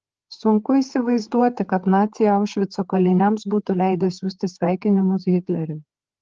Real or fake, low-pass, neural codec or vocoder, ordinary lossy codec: fake; 7.2 kHz; codec, 16 kHz, 4 kbps, FreqCodec, larger model; Opus, 16 kbps